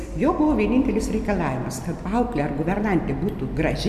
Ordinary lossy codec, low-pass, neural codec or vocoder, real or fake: MP3, 96 kbps; 14.4 kHz; none; real